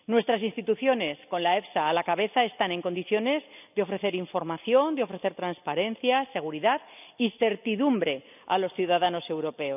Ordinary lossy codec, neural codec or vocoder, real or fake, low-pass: none; none; real; 3.6 kHz